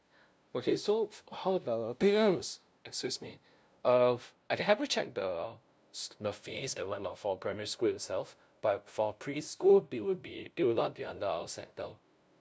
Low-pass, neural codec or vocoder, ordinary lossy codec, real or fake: none; codec, 16 kHz, 0.5 kbps, FunCodec, trained on LibriTTS, 25 frames a second; none; fake